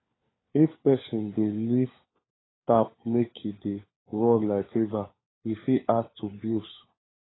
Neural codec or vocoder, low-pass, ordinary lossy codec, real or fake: codec, 16 kHz, 4 kbps, FunCodec, trained on LibriTTS, 50 frames a second; 7.2 kHz; AAC, 16 kbps; fake